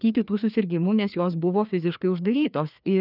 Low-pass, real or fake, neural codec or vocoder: 5.4 kHz; fake; codec, 16 kHz, 2 kbps, FreqCodec, larger model